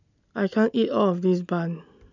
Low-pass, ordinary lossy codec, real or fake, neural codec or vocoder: 7.2 kHz; none; real; none